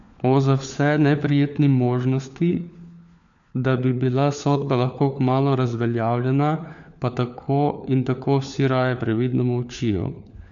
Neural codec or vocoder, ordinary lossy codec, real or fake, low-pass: codec, 16 kHz, 4 kbps, FunCodec, trained on LibriTTS, 50 frames a second; none; fake; 7.2 kHz